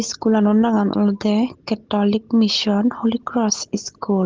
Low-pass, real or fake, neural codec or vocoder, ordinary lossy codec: 7.2 kHz; real; none; Opus, 16 kbps